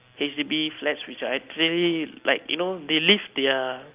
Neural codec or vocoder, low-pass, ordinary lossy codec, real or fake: none; 3.6 kHz; Opus, 24 kbps; real